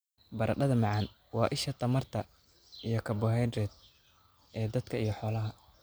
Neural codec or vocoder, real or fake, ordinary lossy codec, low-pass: none; real; none; none